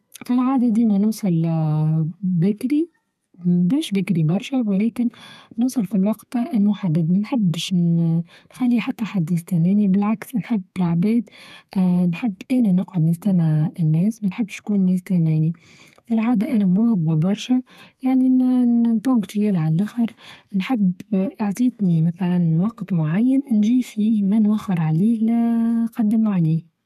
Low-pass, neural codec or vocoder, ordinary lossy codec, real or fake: 14.4 kHz; codec, 32 kHz, 1.9 kbps, SNAC; none; fake